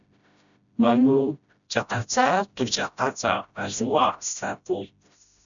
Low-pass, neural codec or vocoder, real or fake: 7.2 kHz; codec, 16 kHz, 0.5 kbps, FreqCodec, smaller model; fake